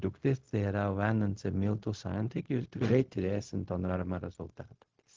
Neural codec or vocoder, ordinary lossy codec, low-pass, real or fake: codec, 16 kHz, 0.4 kbps, LongCat-Audio-Codec; Opus, 16 kbps; 7.2 kHz; fake